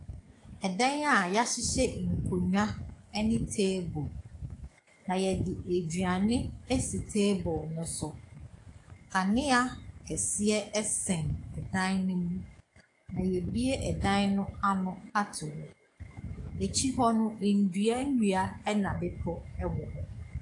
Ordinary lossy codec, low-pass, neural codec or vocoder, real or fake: AAC, 48 kbps; 10.8 kHz; codec, 44.1 kHz, 7.8 kbps, DAC; fake